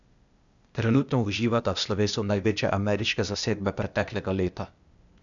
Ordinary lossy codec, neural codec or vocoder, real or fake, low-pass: none; codec, 16 kHz, 0.8 kbps, ZipCodec; fake; 7.2 kHz